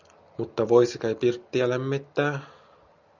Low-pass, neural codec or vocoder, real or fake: 7.2 kHz; none; real